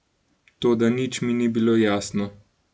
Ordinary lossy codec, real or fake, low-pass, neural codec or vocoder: none; real; none; none